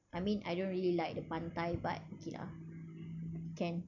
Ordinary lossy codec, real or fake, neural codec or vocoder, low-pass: none; real; none; 7.2 kHz